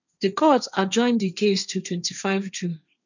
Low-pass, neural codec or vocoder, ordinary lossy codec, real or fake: 7.2 kHz; codec, 16 kHz, 1.1 kbps, Voila-Tokenizer; none; fake